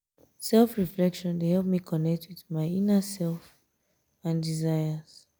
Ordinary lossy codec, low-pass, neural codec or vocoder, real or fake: none; none; none; real